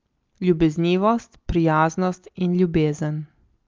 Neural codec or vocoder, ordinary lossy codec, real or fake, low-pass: none; Opus, 24 kbps; real; 7.2 kHz